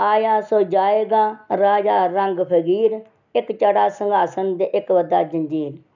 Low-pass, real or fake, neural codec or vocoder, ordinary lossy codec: 7.2 kHz; real; none; none